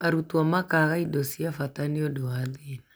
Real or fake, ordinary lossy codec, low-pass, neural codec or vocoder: real; none; none; none